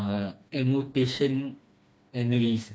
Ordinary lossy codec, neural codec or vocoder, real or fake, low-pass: none; codec, 16 kHz, 2 kbps, FreqCodec, smaller model; fake; none